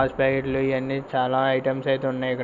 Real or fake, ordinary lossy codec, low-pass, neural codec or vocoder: real; none; 7.2 kHz; none